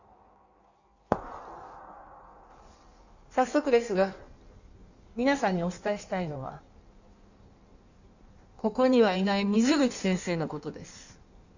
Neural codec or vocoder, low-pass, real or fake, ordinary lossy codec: codec, 16 kHz in and 24 kHz out, 1.1 kbps, FireRedTTS-2 codec; 7.2 kHz; fake; none